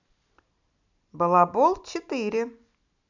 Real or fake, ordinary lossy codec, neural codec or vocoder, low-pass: real; none; none; 7.2 kHz